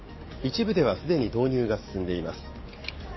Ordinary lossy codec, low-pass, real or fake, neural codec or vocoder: MP3, 24 kbps; 7.2 kHz; fake; codec, 44.1 kHz, 7.8 kbps, DAC